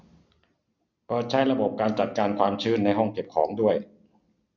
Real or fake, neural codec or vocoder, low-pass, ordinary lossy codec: real; none; 7.2 kHz; none